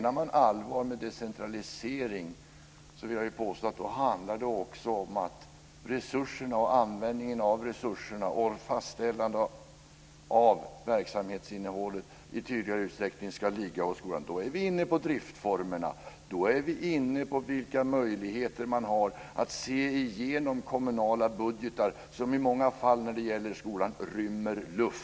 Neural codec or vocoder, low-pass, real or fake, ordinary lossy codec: none; none; real; none